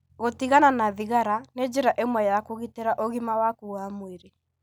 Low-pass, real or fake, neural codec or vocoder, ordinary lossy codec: none; real; none; none